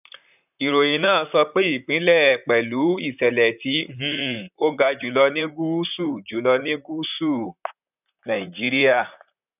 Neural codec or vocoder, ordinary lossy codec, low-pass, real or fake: vocoder, 44.1 kHz, 128 mel bands, Pupu-Vocoder; none; 3.6 kHz; fake